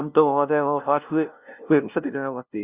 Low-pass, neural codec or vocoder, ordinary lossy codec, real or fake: 3.6 kHz; codec, 16 kHz, 0.5 kbps, FunCodec, trained on LibriTTS, 25 frames a second; Opus, 64 kbps; fake